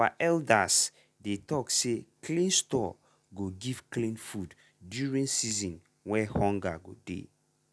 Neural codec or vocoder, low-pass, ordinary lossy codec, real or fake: none; none; none; real